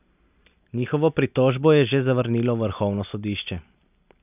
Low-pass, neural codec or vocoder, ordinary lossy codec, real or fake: 3.6 kHz; none; none; real